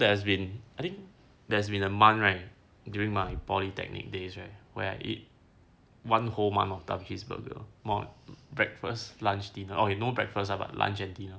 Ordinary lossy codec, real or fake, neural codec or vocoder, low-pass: none; real; none; none